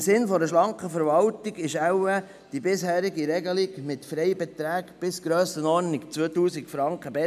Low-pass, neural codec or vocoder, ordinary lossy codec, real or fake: 14.4 kHz; none; none; real